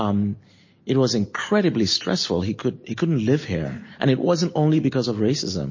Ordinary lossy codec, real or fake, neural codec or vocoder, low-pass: MP3, 32 kbps; real; none; 7.2 kHz